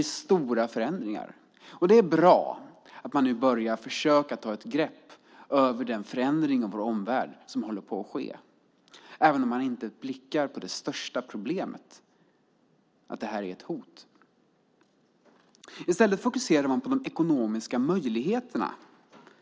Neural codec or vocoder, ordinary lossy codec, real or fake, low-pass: none; none; real; none